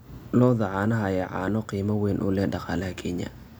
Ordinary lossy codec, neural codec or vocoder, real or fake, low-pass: none; none; real; none